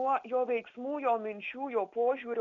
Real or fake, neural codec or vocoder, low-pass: real; none; 7.2 kHz